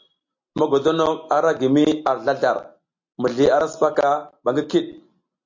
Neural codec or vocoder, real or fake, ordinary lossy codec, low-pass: none; real; MP3, 48 kbps; 7.2 kHz